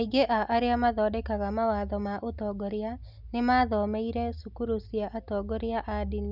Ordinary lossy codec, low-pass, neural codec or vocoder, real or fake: none; 5.4 kHz; none; real